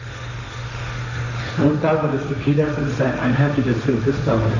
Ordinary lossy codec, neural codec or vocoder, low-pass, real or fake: none; codec, 16 kHz, 1.1 kbps, Voila-Tokenizer; 7.2 kHz; fake